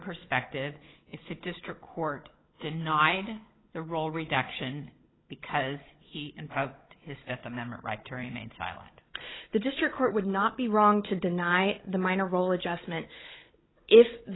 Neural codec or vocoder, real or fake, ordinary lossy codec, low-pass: codec, 16 kHz, 16 kbps, FunCodec, trained on Chinese and English, 50 frames a second; fake; AAC, 16 kbps; 7.2 kHz